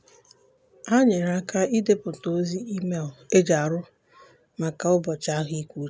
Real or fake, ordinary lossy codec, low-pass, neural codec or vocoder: real; none; none; none